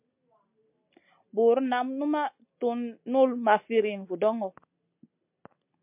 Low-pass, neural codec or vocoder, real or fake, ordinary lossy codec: 3.6 kHz; none; real; MP3, 32 kbps